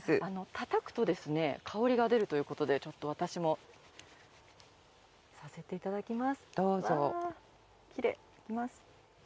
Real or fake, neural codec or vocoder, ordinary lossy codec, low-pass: real; none; none; none